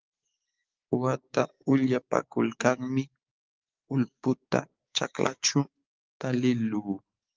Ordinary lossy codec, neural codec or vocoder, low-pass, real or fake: Opus, 24 kbps; codec, 16 kHz, 6 kbps, DAC; 7.2 kHz; fake